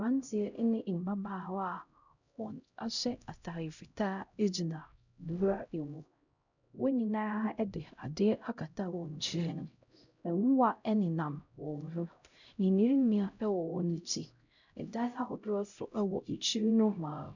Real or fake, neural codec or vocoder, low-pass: fake; codec, 16 kHz, 0.5 kbps, X-Codec, HuBERT features, trained on LibriSpeech; 7.2 kHz